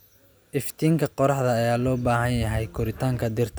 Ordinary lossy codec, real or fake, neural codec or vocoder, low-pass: none; real; none; none